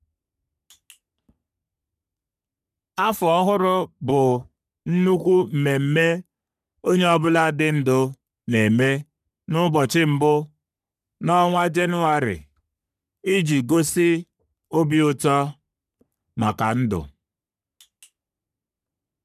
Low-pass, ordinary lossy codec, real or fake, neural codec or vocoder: 14.4 kHz; none; fake; codec, 44.1 kHz, 3.4 kbps, Pupu-Codec